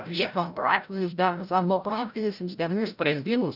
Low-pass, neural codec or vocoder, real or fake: 5.4 kHz; codec, 16 kHz, 0.5 kbps, FreqCodec, larger model; fake